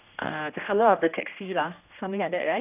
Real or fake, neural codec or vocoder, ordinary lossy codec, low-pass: fake; codec, 16 kHz, 1 kbps, X-Codec, HuBERT features, trained on general audio; none; 3.6 kHz